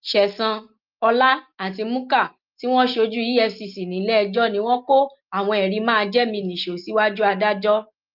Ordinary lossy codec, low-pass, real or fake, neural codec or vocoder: Opus, 24 kbps; 5.4 kHz; real; none